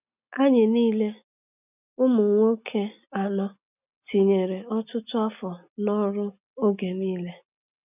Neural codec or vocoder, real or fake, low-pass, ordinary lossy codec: none; real; 3.6 kHz; none